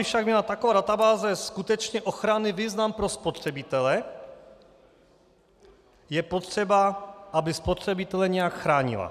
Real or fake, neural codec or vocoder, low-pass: real; none; 14.4 kHz